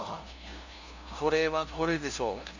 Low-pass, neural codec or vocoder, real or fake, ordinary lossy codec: 7.2 kHz; codec, 16 kHz, 0.5 kbps, FunCodec, trained on LibriTTS, 25 frames a second; fake; Opus, 64 kbps